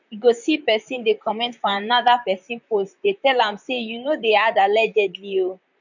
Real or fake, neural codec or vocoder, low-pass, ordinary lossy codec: fake; vocoder, 44.1 kHz, 128 mel bands, Pupu-Vocoder; 7.2 kHz; none